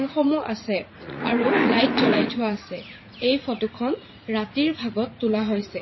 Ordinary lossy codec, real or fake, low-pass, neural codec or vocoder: MP3, 24 kbps; fake; 7.2 kHz; vocoder, 44.1 kHz, 80 mel bands, Vocos